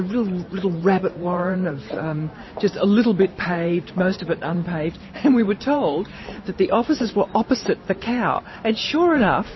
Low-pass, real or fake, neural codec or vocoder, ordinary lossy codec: 7.2 kHz; fake; vocoder, 44.1 kHz, 128 mel bands every 512 samples, BigVGAN v2; MP3, 24 kbps